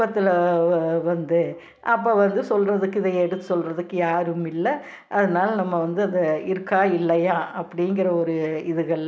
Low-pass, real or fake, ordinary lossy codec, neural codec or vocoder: none; real; none; none